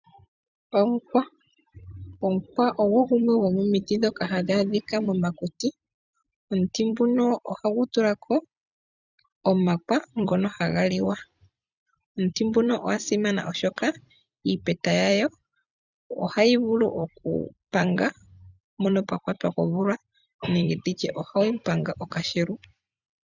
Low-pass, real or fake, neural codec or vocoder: 7.2 kHz; fake; vocoder, 44.1 kHz, 128 mel bands every 256 samples, BigVGAN v2